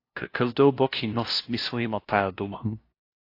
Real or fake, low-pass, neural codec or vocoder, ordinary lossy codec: fake; 5.4 kHz; codec, 16 kHz, 0.5 kbps, FunCodec, trained on LibriTTS, 25 frames a second; AAC, 32 kbps